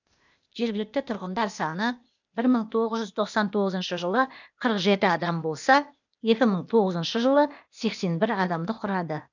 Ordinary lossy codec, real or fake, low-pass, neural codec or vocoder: none; fake; 7.2 kHz; codec, 16 kHz, 0.8 kbps, ZipCodec